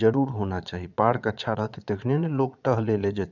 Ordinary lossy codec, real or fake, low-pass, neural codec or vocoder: none; fake; 7.2 kHz; vocoder, 22.05 kHz, 80 mel bands, Vocos